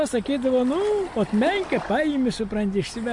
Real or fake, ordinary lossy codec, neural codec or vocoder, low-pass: real; MP3, 48 kbps; none; 10.8 kHz